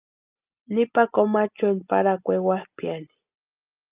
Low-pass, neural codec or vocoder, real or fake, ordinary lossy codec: 3.6 kHz; none; real; Opus, 24 kbps